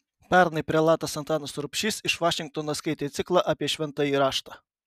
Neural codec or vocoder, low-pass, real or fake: none; 14.4 kHz; real